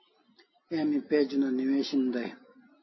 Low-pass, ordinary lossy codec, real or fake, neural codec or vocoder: 7.2 kHz; MP3, 24 kbps; real; none